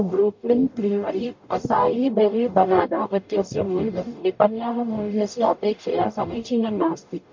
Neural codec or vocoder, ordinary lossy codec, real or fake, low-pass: codec, 44.1 kHz, 0.9 kbps, DAC; MP3, 48 kbps; fake; 7.2 kHz